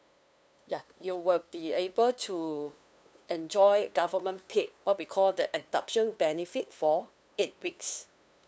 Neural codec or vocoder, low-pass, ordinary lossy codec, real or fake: codec, 16 kHz, 2 kbps, FunCodec, trained on LibriTTS, 25 frames a second; none; none; fake